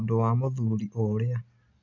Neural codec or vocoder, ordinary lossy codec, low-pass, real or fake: none; none; 7.2 kHz; real